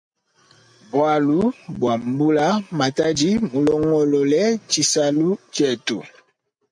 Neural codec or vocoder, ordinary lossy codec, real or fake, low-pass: none; MP3, 64 kbps; real; 9.9 kHz